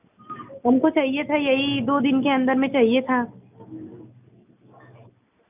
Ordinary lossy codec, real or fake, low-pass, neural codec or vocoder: none; real; 3.6 kHz; none